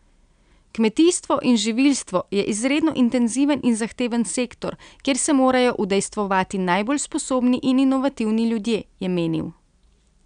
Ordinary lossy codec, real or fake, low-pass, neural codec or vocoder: none; real; 9.9 kHz; none